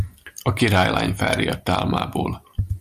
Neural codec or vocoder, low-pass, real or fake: none; 14.4 kHz; real